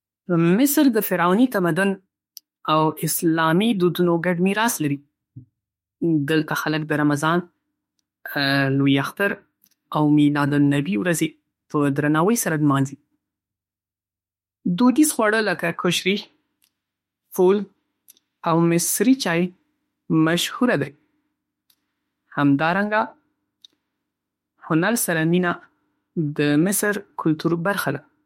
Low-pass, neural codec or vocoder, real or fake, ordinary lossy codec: 19.8 kHz; autoencoder, 48 kHz, 32 numbers a frame, DAC-VAE, trained on Japanese speech; fake; MP3, 64 kbps